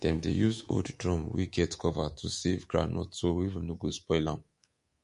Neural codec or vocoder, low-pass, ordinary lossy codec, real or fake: codec, 24 kHz, 3.1 kbps, DualCodec; 10.8 kHz; MP3, 48 kbps; fake